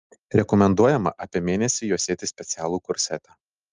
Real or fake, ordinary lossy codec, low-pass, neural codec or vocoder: real; Opus, 32 kbps; 7.2 kHz; none